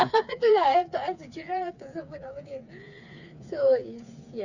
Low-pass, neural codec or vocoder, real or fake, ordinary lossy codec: 7.2 kHz; codec, 16 kHz, 4 kbps, FreqCodec, smaller model; fake; none